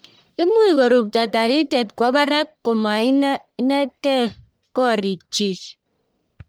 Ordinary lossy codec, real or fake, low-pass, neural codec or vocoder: none; fake; none; codec, 44.1 kHz, 1.7 kbps, Pupu-Codec